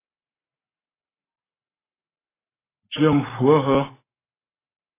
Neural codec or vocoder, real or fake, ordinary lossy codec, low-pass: codec, 44.1 kHz, 7.8 kbps, Pupu-Codec; fake; AAC, 16 kbps; 3.6 kHz